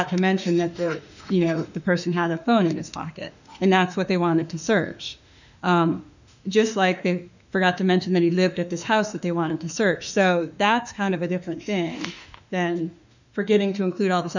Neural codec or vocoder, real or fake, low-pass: autoencoder, 48 kHz, 32 numbers a frame, DAC-VAE, trained on Japanese speech; fake; 7.2 kHz